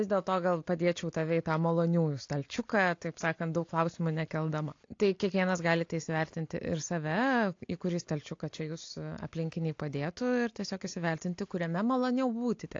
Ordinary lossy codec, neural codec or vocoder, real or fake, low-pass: AAC, 48 kbps; none; real; 7.2 kHz